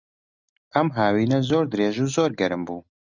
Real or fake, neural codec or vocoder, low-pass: real; none; 7.2 kHz